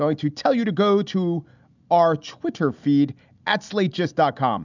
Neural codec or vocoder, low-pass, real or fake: none; 7.2 kHz; real